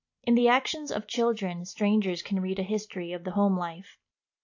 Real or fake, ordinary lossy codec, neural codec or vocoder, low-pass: real; AAC, 48 kbps; none; 7.2 kHz